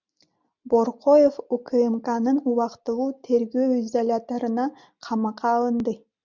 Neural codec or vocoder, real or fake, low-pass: none; real; 7.2 kHz